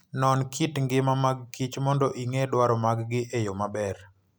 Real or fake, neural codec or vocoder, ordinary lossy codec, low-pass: real; none; none; none